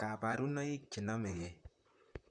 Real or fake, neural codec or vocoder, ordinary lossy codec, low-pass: fake; vocoder, 44.1 kHz, 128 mel bands, Pupu-Vocoder; none; 9.9 kHz